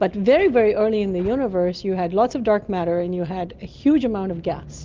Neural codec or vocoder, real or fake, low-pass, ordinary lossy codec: none; real; 7.2 kHz; Opus, 32 kbps